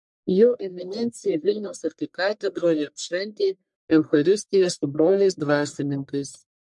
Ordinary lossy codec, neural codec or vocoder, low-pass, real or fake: MP3, 64 kbps; codec, 44.1 kHz, 1.7 kbps, Pupu-Codec; 10.8 kHz; fake